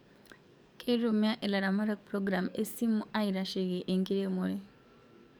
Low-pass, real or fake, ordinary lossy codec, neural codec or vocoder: none; fake; none; codec, 44.1 kHz, 7.8 kbps, DAC